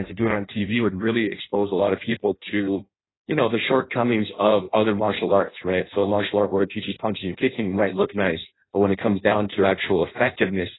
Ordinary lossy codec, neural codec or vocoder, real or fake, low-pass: AAC, 16 kbps; codec, 16 kHz in and 24 kHz out, 0.6 kbps, FireRedTTS-2 codec; fake; 7.2 kHz